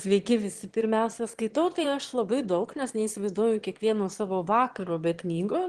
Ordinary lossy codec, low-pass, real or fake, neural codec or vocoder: Opus, 16 kbps; 9.9 kHz; fake; autoencoder, 22.05 kHz, a latent of 192 numbers a frame, VITS, trained on one speaker